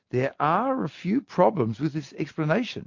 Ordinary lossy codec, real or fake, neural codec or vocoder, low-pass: MP3, 48 kbps; real; none; 7.2 kHz